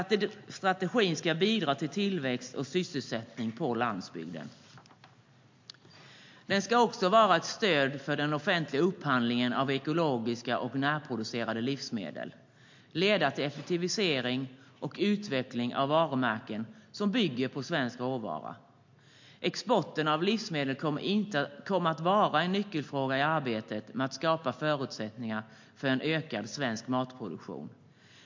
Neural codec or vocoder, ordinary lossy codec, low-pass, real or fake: none; MP3, 48 kbps; 7.2 kHz; real